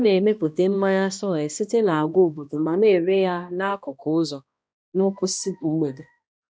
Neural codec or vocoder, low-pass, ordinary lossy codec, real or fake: codec, 16 kHz, 1 kbps, X-Codec, HuBERT features, trained on balanced general audio; none; none; fake